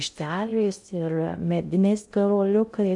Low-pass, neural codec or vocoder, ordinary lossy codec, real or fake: 10.8 kHz; codec, 16 kHz in and 24 kHz out, 0.6 kbps, FocalCodec, streaming, 2048 codes; MP3, 96 kbps; fake